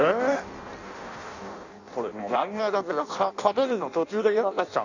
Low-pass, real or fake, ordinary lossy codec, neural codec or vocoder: 7.2 kHz; fake; none; codec, 16 kHz in and 24 kHz out, 0.6 kbps, FireRedTTS-2 codec